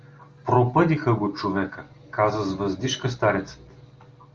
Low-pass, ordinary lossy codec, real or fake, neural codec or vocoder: 7.2 kHz; Opus, 32 kbps; real; none